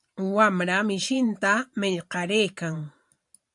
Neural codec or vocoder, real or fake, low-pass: vocoder, 44.1 kHz, 128 mel bands every 512 samples, BigVGAN v2; fake; 10.8 kHz